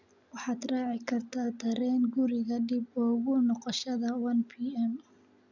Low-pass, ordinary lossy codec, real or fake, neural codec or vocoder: 7.2 kHz; none; real; none